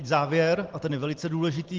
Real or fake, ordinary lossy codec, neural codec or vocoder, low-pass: real; Opus, 16 kbps; none; 7.2 kHz